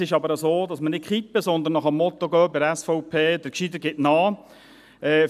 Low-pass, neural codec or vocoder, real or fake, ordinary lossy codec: 14.4 kHz; none; real; none